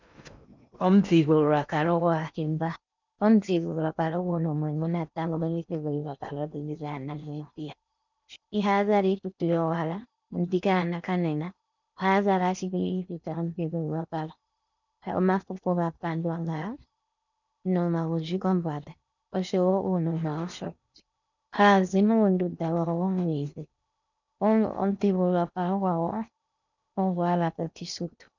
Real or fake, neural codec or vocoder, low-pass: fake; codec, 16 kHz in and 24 kHz out, 0.8 kbps, FocalCodec, streaming, 65536 codes; 7.2 kHz